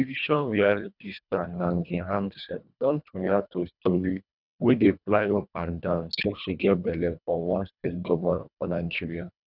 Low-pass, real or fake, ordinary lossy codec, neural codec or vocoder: 5.4 kHz; fake; none; codec, 24 kHz, 1.5 kbps, HILCodec